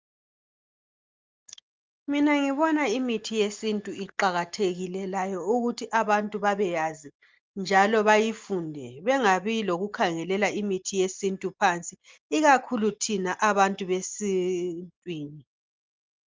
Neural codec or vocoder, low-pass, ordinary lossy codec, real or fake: none; 7.2 kHz; Opus, 24 kbps; real